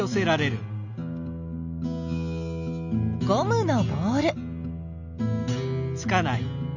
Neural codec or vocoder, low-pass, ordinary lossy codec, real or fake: none; 7.2 kHz; none; real